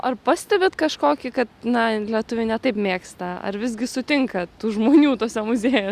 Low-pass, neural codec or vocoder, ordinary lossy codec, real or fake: 14.4 kHz; none; Opus, 64 kbps; real